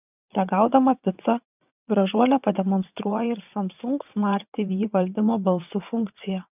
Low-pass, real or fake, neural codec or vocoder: 3.6 kHz; fake; vocoder, 22.05 kHz, 80 mel bands, WaveNeXt